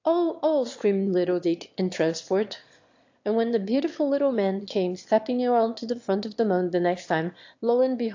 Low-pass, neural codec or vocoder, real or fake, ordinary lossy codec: 7.2 kHz; autoencoder, 22.05 kHz, a latent of 192 numbers a frame, VITS, trained on one speaker; fake; AAC, 48 kbps